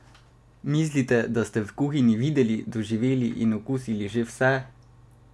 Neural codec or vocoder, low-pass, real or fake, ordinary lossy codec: none; none; real; none